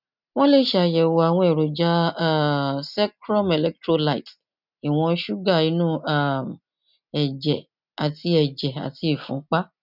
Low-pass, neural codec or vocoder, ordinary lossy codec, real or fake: 5.4 kHz; none; none; real